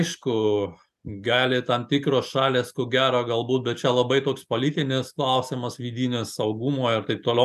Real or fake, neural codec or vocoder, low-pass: real; none; 14.4 kHz